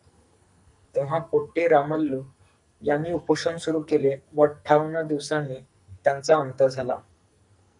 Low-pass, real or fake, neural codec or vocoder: 10.8 kHz; fake; codec, 44.1 kHz, 2.6 kbps, SNAC